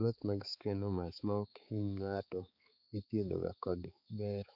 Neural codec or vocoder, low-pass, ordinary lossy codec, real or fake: codec, 16 kHz, 4 kbps, X-Codec, HuBERT features, trained on balanced general audio; 5.4 kHz; none; fake